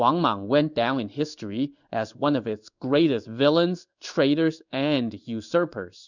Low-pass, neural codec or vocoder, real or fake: 7.2 kHz; codec, 16 kHz in and 24 kHz out, 1 kbps, XY-Tokenizer; fake